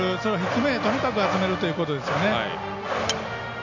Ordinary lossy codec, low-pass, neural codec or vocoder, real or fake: none; 7.2 kHz; none; real